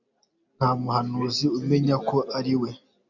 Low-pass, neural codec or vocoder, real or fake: 7.2 kHz; none; real